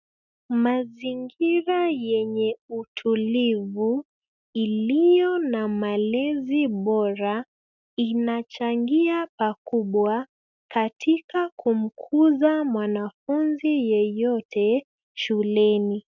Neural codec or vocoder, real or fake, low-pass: none; real; 7.2 kHz